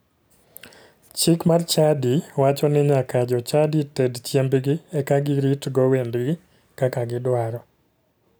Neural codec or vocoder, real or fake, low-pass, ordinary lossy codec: none; real; none; none